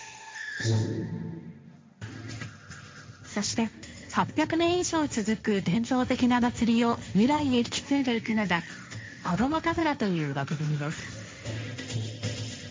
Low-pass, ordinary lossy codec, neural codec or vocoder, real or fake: none; none; codec, 16 kHz, 1.1 kbps, Voila-Tokenizer; fake